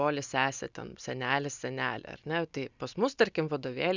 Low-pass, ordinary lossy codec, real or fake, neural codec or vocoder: 7.2 kHz; Opus, 64 kbps; real; none